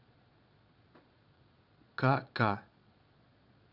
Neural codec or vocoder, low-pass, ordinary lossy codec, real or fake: vocoder, 22.05 kHz, 80 mel bands, Vocos; 5.4 kHz; none; fake